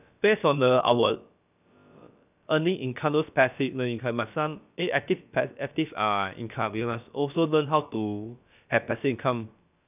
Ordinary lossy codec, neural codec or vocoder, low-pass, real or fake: none; codec, 16 kHz, about 1 kbps, DyCAST, with the encoder's durations; 3.6 kHz; fake